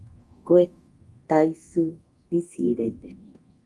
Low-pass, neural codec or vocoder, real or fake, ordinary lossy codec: 10.8 kHz; codec, 24 kHz, 0.9 kbps, DualCodec; fake; Opus, 32 kbps